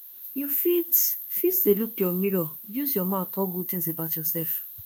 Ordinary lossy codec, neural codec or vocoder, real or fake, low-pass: none; autoencoder, 48 kHz, 32 numbers a frame, DAC-VAE, trained on Japanese speech; fake; none